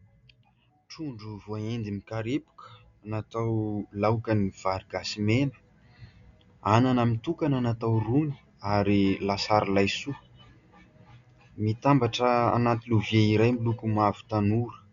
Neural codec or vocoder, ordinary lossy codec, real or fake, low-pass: none; Opus, 64 kbps; real; 7.2 kHz